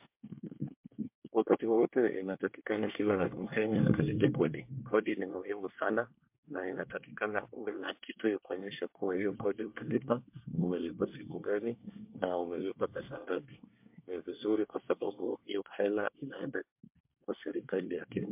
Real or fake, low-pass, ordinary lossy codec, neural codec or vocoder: fake; 3.6 kHz; MP3, 32 kbps; codec, 24 kHz, 1 kbps, SNAC